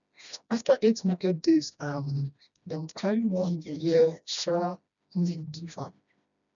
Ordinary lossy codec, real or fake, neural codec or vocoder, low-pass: none; fake; codec, 16 kHz, 1 kbps, FreqCodec, smaller model; 7.2 kHz